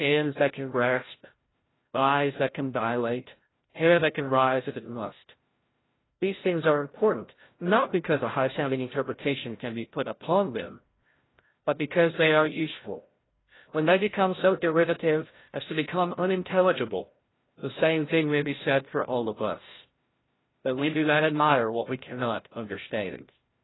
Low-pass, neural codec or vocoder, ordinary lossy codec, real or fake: 7.2 kHz; codec, 16 kHz, 0.5 kbps, FreqCodec, larger model; AAC, 16 kbps; fake